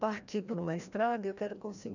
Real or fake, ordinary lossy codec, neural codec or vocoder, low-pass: fake; none; codec, 16 kHz, 1 kbps, FreqCodec, larger model; 7.2 kHz